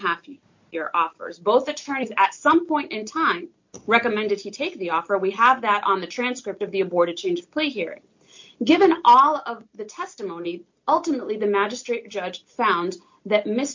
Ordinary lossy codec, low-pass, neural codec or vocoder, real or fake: MP3, 48 kbps; 7.2 kHz; none; real